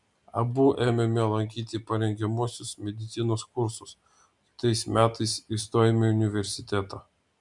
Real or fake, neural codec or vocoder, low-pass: real; none; 10.8 kHz